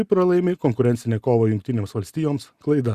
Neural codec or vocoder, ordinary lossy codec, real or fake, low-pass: none; Opus, 64 kbps; real; 14.4 kHz